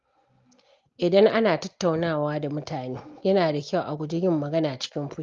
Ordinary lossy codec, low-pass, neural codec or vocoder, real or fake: Opus, 32 kbps; 7.2 kHz; none; real